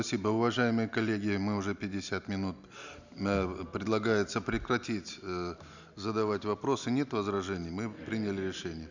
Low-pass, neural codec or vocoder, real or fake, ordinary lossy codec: 7.2 kHz; none; real; none